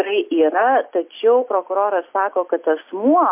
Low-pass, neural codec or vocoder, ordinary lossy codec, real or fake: 3.6 kHz; none; MP3, 32 kbps; real